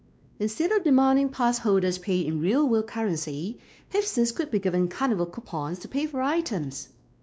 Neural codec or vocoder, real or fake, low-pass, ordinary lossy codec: codec, 16 kHz, 2 kbps, X-Codec, WavLM features, trained on Multilingual LibriSpeech; fake; none; none